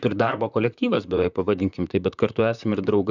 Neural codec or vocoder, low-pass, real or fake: vocoder, 44.1 kHz, 128 mel bands, Pupu-Vocoder; 7.2 kHz; fake